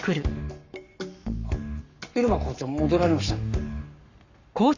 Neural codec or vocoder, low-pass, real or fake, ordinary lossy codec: codec, 44.1 kHz, 7.8 kbps, Pupu-Codec; 7.2 kHz; fake; none